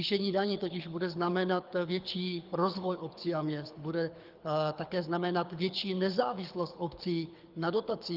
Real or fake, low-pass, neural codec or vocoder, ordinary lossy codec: fake; 5.4 kHz; codec, 24 kHz, 6 kbps, HILCodec; Opus, 24 kbps